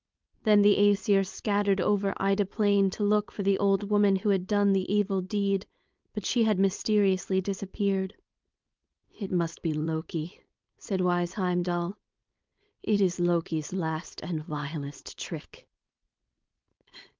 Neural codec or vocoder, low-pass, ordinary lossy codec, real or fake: codec, 16 kHz, 4.8 kbps, FACodec; 7.2 kHz; Opus, 24 kbps; fake